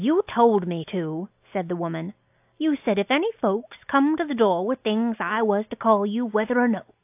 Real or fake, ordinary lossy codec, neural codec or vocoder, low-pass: real; AAC, 32 kbps; none; 3.6 kHz